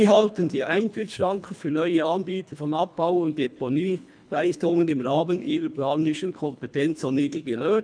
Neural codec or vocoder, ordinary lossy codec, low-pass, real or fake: codec, 24 kHz, 1.5 kbps, HILCodec; none; 9.9 kHz; fake